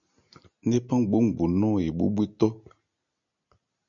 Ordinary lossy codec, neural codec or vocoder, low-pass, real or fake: MP3, 96 kbps; none; 7.2 kHz; real